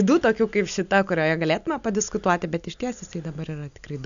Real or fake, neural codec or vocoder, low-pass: real; none; 7.2 kHz